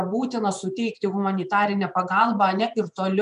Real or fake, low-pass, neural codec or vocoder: fake; 10.8 kHz; vocoder, 24 kHz, 100 mel bands, Vocos